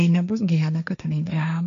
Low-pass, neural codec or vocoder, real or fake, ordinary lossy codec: 7.2 kHz; codec, 16 kHz, 1.1 kbps, Voila-Tokenizer; fake; MP3, 96 kbps